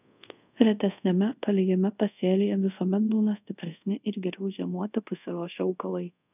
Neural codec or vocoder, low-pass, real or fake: codec, 24 kHz, 0.5 kbps, DualCodec; 3.6 kHz; fake